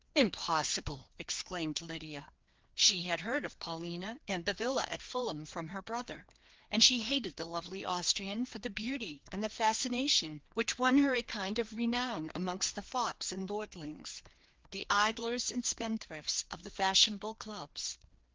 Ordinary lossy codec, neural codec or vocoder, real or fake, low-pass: Opus, 16 kbps; codec, 16 kHz, 2 kbps, FreqCodec, larger model; fake; 7.2 kHz